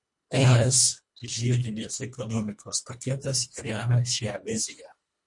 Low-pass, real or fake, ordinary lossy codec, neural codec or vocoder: 10.8 kHz; fake; MP3, 48 kbps; codec, 24 kHz, 1.5 kbps, HILCodec